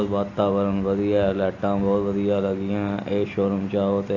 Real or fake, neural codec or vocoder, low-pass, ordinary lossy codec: real; none; 7.2 kHz; AAC, 48 kbps